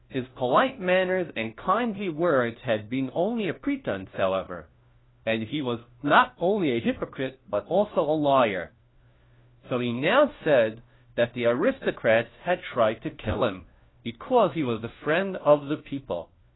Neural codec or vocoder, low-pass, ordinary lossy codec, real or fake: codec, 16 kHz, 1 kbps, FunCodec, trained on LibriTTS, 50 frames a second; 7.2 kHz; AAC, 16 kbps; fake